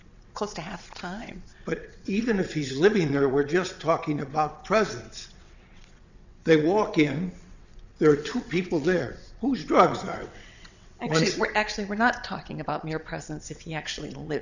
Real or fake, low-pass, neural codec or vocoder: fake; 7.2 kHz; vocoder, 22.05 kHz, 80 mel bands, WaveNeXt